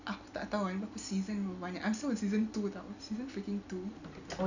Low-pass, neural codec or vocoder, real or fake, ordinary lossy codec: 7.2 kHz; none; real; none